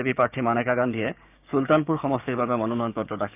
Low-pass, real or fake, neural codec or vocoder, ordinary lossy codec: 3.6 kHz; fake; codec, 16 kHz, 6 kbps, DAC; none